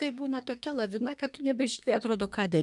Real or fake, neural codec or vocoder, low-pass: fake; codec, 24 kHz, 1 kbps, SNAC; 10.8 kHz